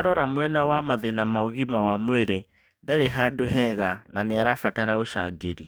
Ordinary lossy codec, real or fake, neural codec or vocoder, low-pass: none; fake; codec, 44.1 kHz, 2.6 kbps, DAC; none